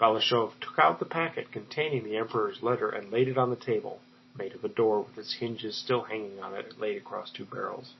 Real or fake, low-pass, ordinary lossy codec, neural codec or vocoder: real; 7.2 kHz; MP3, 24 kbps; none